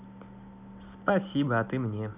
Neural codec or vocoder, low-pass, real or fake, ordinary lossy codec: none; 3.6 kHz; real; none